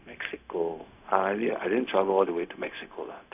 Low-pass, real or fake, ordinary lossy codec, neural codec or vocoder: 3.6 kHz; fake; none; codec, 16 kHz, 0.4 kbps, LongCat-Audio-Codec